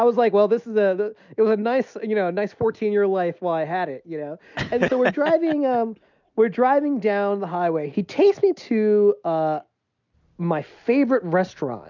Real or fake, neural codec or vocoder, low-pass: real; none; 7.2 kHz